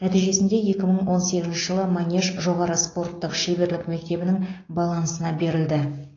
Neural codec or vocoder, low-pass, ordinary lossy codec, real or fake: codec, 16 kHz, 6 kbps, DAC; 7.2 kHz; AAC, 32 kbps; fake